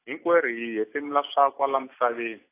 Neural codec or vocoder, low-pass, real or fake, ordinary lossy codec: none; 3.6 kHz; real; AAC, 24 kbps